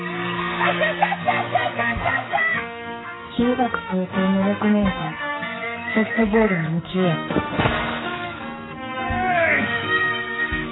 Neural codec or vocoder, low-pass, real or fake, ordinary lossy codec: codec, 44.1 kHz, 2.6 kbps, SNAC; 7.2 kHz; fake; AAC, 16 kbps